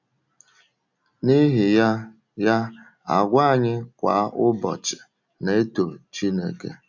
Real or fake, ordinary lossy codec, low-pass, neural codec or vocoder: real; none; 7.2 kHz; none